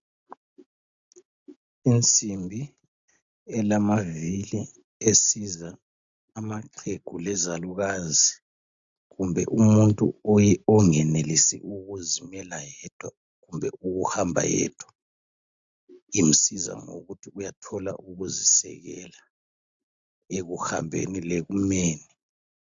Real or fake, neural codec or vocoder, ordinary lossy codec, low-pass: real; none; AAC, 64 kbps; 7.2 kHz